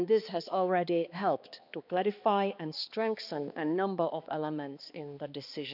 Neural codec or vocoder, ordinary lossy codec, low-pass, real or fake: codec, 16 kHz, 2 kbps, X-Codec, HuBERT features, trained on balanced general audio; none; 5.4 kHz; fake